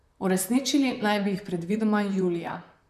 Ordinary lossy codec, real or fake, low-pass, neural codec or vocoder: none; fake; 14.4 kHz; vocoder, 44.1 kHz, 128 mel bands, Pupu-Vocoder